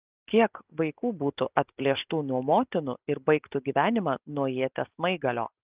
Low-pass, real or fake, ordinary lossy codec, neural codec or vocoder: 3.6 kHz; real; Opus, 24 kbps; none